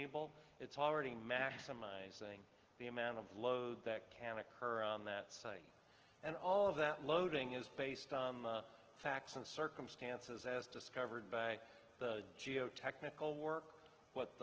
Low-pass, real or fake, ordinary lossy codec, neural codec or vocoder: 7.2 kHz; real; Opus, 16 kbps; none